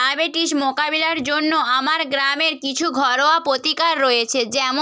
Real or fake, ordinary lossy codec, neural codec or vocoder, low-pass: real; none; none; none